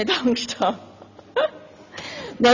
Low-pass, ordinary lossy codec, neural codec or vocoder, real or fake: 7.2 kHz; none; none; real